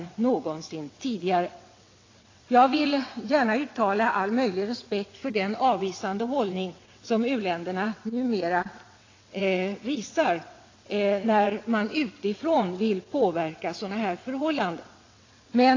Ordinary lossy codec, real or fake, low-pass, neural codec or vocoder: AAC, 32 kbps; fake; 7.2 kHz; vocoder, 22.05 kHz, 80 mel bands, WaveNeXt